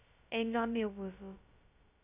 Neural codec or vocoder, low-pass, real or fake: codec, 16 kHz, 0.2 kbps, FocalCodec; 3.6 kHz; fake